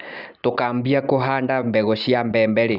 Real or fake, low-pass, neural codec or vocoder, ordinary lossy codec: real; 5.4 kHz; none; none